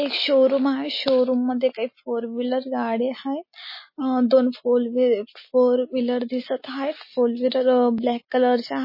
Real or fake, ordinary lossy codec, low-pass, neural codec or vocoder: real; MP3, 24 kbps; 5.4 kHz; none